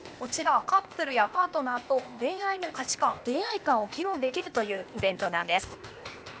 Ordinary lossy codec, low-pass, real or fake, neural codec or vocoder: none; none; fake; codec, 16 kHz, 0.8 kbps, ZipCodec